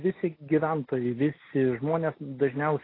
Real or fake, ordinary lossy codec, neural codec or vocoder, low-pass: real; AAC, 24 kbps; none; 5.4 kHz